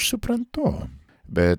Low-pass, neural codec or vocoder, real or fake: 19.8 kHz; vocoder, 44.1 kHz, 128 mel bands every 512 samples, BigVGAN v2; fake